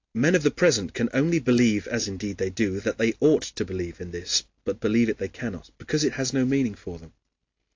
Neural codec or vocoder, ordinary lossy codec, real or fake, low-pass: none; AAC, 48 kbps; real; 7.2 kHz